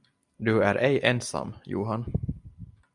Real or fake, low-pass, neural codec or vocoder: real; 10.8 kHz; none